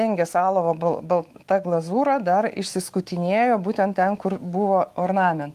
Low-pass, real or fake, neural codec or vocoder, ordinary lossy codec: 14.4 kHz; fake; autoencoder, 48 kHz, 128 numbers a frame, DAC-VAE, trained on Japanese speech; Opus, 24 kbps